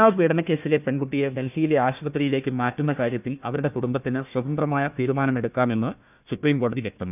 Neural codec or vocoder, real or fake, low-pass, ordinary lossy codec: codec, 16 kHz, 1 kbps, FunCodec, trained on Chinese and English, 50 frames a second; fake; 3.6 kHz; none